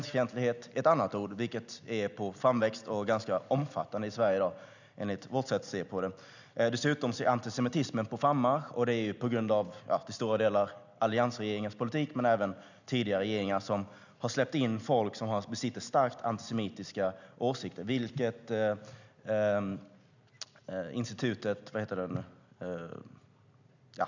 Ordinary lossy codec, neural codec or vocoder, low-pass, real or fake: none; none; 7.2 kHz; real